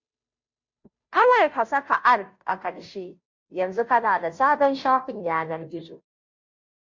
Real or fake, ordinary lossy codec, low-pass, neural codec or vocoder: fake; MP3, 48 kbps; 7.2 kHz; codec, 16 kHz, 0.5 kbps, FunCodec, trained on Chinese and English, 25 frames a second